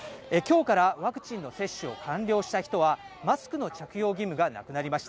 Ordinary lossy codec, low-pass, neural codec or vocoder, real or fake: none; none; none; real